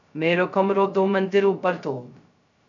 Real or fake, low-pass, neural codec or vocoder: fake; 7.2 kHz; codec, 16 kHz, 0.2 kbps, FocalCodec